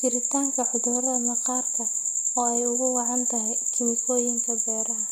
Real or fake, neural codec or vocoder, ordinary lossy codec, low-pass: real; none; none; none